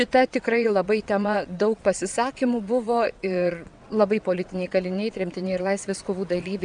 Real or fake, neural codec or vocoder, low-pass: fake; vocoder, 22.05 kHz, 80 mel bands, WaveNeXt; 9.9 kHz